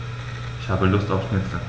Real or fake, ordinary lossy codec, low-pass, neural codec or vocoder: real; none; none; none